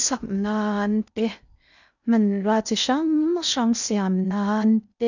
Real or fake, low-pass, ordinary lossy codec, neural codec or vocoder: fake; 7.2 kHz; none; codec, 16 kHz in and 24 kHz out, 0.6 kbps, FocalCodec, streaming, 2048 codes